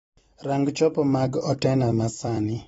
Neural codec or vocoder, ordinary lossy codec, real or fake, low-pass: vocoder, 44.1 kHz, 128 mel bands every 256 samples, BigVGAN v2; AAC, 24 kbps; fake; 19.8 kHz